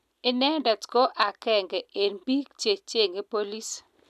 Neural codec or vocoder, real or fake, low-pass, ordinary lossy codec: none; real; 14.4 kHz; none